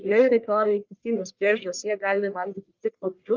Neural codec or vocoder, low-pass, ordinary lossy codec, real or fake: codec, 44.1 kHz, 1.7 kbps, Pupu-Codec; 7.2 kHz; Opus, 24 kbps; fake